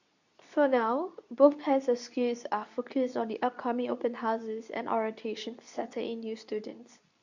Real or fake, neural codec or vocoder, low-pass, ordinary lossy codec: fake; codec, 24 kHz, 0.9 kbps, WavTokenizer, medium speech release version 2; 7.2 kHz; none